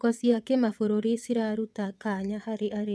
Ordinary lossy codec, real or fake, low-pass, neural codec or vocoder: none; fake; none; vocoder, 22.05 kHz, 80 mel bands, WaveNeXt